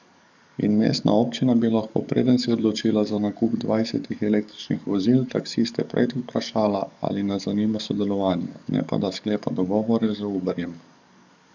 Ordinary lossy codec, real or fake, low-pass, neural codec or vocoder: none; fake; 7.2 kHz; codec, 44.1 kHz, 7.8 kbps, DAC